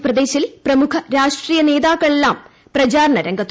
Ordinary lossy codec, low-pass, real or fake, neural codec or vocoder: none; none; real; none